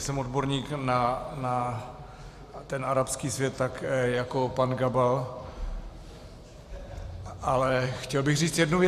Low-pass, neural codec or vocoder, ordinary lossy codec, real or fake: 14.4 kHz; vocoder, 44.1 kHz, 128 mel bands every 512 samples, BigVGAN v2; Opus, 64 kbps; fake